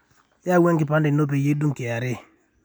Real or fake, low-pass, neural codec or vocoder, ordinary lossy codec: real; none; none; none